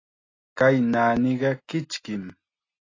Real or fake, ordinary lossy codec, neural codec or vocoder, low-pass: real; AAC, 32 kbps; none; 7.2 kHz